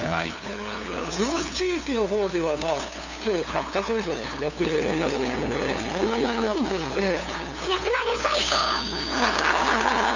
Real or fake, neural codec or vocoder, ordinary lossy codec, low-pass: fake; codec, 16 kHz, 2 kbps, FunCodec, trained on LibriTTS, 25 frames a second; none; 7.2 kHz